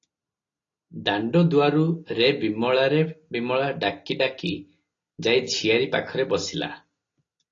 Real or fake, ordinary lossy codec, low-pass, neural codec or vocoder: real; AAC, 32 kbps; 7.2 kHz; none